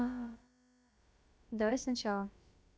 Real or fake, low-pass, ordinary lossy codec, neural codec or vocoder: fake; none; none; codec, 16 kHz, about 1 kbps, DyCAST, with the encoder's durations